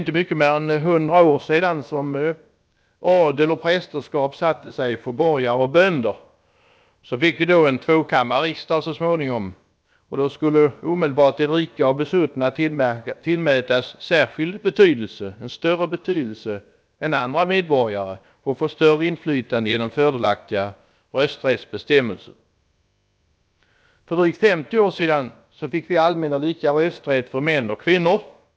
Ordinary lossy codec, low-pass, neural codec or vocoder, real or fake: none; none; codec, 16 kHz, about 1 kbps, DyCAST, with the encoder's durations; fake